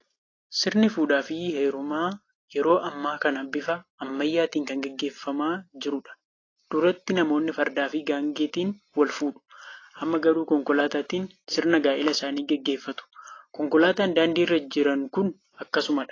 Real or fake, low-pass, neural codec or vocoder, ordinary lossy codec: real; 7.2 kHz; none; AAC, 32 kbps